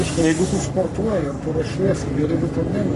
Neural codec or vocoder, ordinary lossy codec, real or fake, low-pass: codec, 44.1 kHz, 3.4 kbps, Pupu-Codec; MP3, 48 kbps; fake; 14.4 kHz